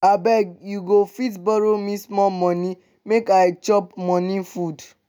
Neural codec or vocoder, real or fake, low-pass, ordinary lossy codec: none; real; 19.8 kHz; none